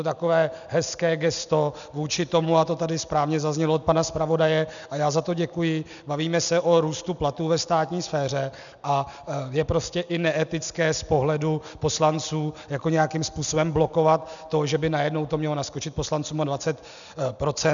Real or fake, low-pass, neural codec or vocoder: real; 7.2 kHz; none